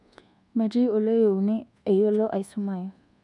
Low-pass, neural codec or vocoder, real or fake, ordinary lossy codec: 10.8 kHz; codec, 24 kHz, 1.2 kbps, DualCodec; fake; none